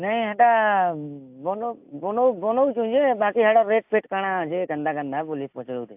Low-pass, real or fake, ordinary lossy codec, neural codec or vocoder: 3.6 kHz; real; AAC, 32 kbps; none